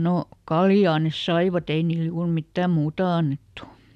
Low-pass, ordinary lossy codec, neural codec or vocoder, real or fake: 14.4 kHz; none; none; real